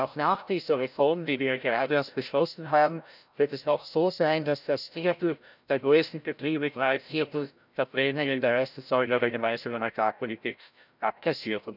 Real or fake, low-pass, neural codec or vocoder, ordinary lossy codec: fake; 5.4 kHz; codec, 16 kHz, 0.5 kbps, FreqCodec, larger model; none